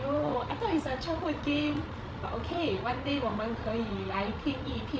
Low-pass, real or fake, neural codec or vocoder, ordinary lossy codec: none; fake; codec, 16 kHz, 16 kbps, FreqCodec, larger model; none